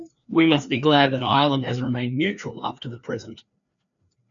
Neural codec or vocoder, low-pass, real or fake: codec, 16 kHz, 2 kbps, FreqCodec, larger model; 7.2 kHz; fake